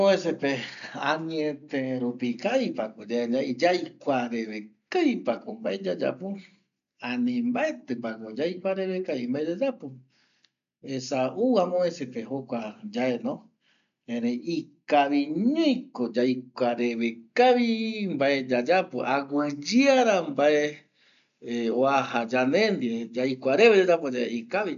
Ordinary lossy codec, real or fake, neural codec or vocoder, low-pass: none; real; none; 7.2 kHz